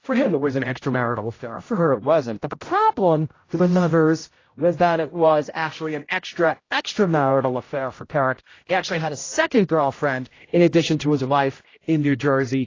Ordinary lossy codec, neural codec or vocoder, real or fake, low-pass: AAC, 32 kbps; codec, 16 kHz, 0.5 kbps, X-Codec, HuBERT features, trained on general audio; fake; 7.2 kHz